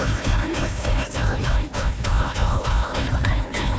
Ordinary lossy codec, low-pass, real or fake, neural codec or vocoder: none; none; fake; codec, 16 kHz, 1 kbps, FunCodec, trained on Chinese and English, 50 frames a second